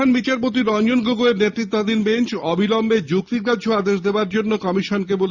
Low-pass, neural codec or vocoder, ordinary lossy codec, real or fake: 7.2 kHz; none; Opus, 64 kbps; real